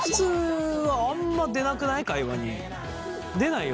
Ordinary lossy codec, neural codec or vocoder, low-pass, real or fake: none; none; none; real